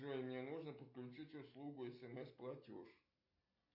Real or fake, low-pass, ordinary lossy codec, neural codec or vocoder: real; 5.4 kHz; AAC, 48 kbps; none